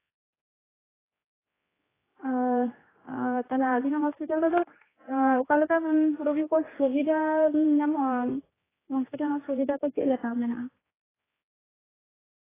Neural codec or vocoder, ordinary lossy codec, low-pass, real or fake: codec, 16 kHz, 2 kbps, X-Codec, HuBERT features, trained on general audio; AAC, 16 kbps; 3.6 kHz; fake